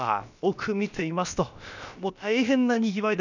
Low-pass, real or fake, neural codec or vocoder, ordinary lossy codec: 7.2 kHz; fake; codec, 16 kHz, about 1 kbps, DyCAST, with the encoder's durations; none